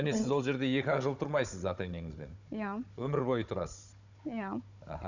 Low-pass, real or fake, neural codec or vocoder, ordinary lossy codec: 7.2 kHz; fake; codec, 16 kHz, 16 kbps, FunCodec, trained on Chinese and English, 50 frames a second; none